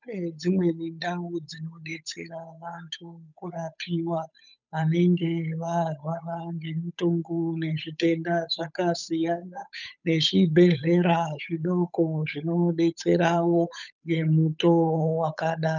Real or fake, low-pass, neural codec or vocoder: fake; 7.2 kHz; codec, 16 kHz, 8 kbps, FunCodec, trained on Chinese and English, 25 frames a second